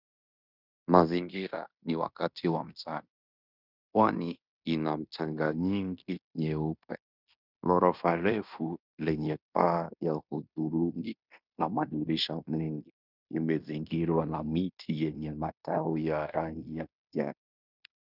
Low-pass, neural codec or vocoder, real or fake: 5.4 kHz; codec, 16 kHz in and 24 kHz out, 0.9 kbps, LongCat-Audio-Codec, fine tuned four codebook decoder; fake